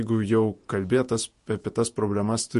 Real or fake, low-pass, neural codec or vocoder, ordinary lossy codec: fake; 14.4 kHz; vocoder, 48 kHz, 128 mel bands, Vocos; MP3, 48 kbps